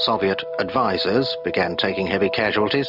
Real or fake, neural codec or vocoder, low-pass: real; none; 5.4 kHz